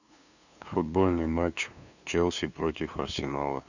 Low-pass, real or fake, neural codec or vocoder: 7.2 kHz; fake; codec, 16 kHz, 2 kbps, FunCodec, trained on LibriTTS, 25 frames a second